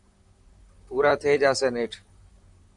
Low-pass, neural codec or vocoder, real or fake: 10.8 kHz; vocoder, 44.1 kHz, 128 mel bands, Pupu-Vocoder; fake